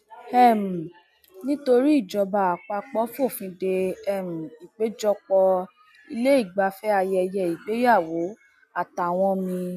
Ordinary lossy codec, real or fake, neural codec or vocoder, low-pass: none; real; none; 14.4 kHz